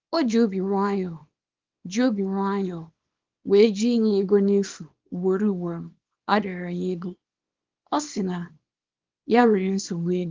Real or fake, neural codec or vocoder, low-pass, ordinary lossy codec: fake; codec, 24 kHz, 0.9 kbps, WavTokenizer, small release; 7.2 kHz; Opus, 32 kbps